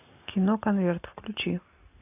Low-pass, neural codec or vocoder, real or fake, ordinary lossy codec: 3.6 kHz; none; real; AAC, 32 kbps